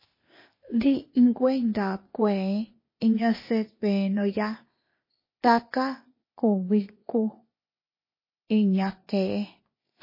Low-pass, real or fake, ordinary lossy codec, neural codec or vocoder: 5.4 kHz; fake; MP3, 24 kbps; codec, 16 kHz, 0.8 kbps, ZipCodec